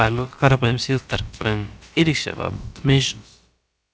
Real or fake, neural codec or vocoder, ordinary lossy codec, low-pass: fake; codec, 16 kHz, about 1 kbps, DyCAST, with the encoder's durations; none; none